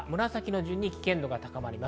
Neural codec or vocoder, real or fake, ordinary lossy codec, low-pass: none; real; none; none